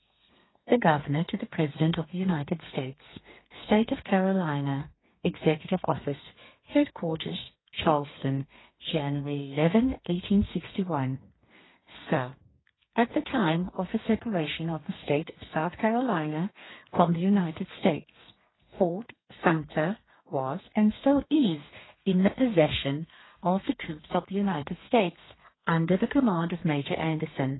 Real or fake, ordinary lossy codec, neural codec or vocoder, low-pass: fake; AAC, 16 kbps; codec, 32 kHz, 1.9 kbps, SNAC; 7.2 kHz